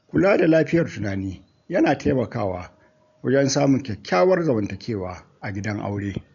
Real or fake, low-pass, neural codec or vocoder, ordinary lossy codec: real; 7.2 kHz; none; none